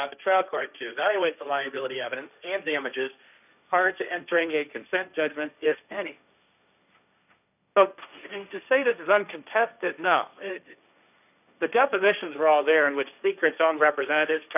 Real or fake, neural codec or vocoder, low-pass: fake; codec, 16 kHz, 1.1 kbps, Voila-Tokenizer; 3.6 kHz